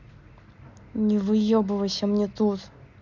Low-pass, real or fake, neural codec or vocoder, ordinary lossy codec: 7.2 kHz; real; none; none